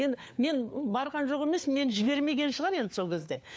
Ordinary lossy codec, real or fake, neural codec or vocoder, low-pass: none; fake; codec, 16 kHz, 4 kbps, FunCodec, trained on LibriTTS, 50 frames a second; none